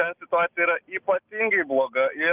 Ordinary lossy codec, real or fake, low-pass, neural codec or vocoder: Opus, 32 kbps; real; 3.6 kHz; none